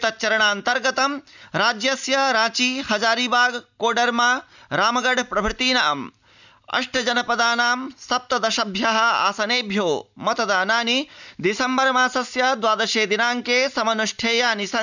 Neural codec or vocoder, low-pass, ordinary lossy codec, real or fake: none; 7.2 kHz; none; real